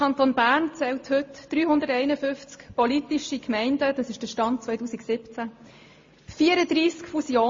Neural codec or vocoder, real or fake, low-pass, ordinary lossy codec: none; real; 7.2 kHz; MP3, 32 kbps